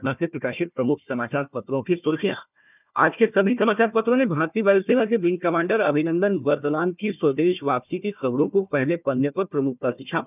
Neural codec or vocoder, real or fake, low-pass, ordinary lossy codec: codec, 16 kHz, 1 kbps, FunCodec, trained on Chinese and English, 50 frames a second; fake; 3.6 kHz; none